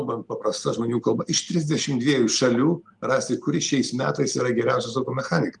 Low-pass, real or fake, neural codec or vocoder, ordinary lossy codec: 10.8 kHz; real; none; Opus, 32 kbps